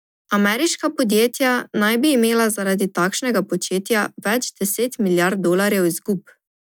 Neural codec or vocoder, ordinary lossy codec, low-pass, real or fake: none; none; none; real